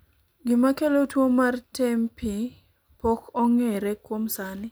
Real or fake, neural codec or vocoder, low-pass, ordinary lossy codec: real; none; none; none